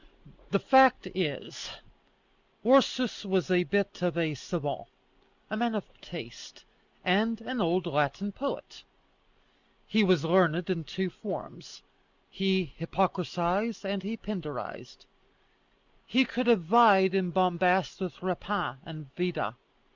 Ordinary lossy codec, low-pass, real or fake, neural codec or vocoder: Opus, 64 kbps; 7.2 kHz; real; none